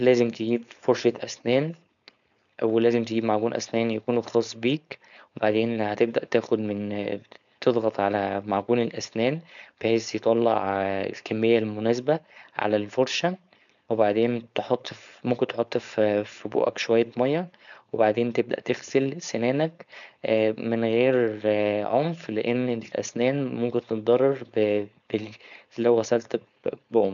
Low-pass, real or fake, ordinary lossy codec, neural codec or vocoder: 7.2 kHz; fake; none; codec, 16 kHz, 4.8 kbps, FACodec